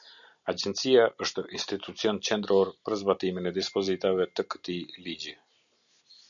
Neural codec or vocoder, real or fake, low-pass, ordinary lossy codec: none; real; 7.2 kHz; MP3, 96 kbps